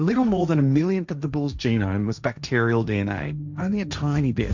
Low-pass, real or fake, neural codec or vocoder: 7.2 kHz; fake; codec, 16 kHz, 1.1 kbps, Voila-Tokenizer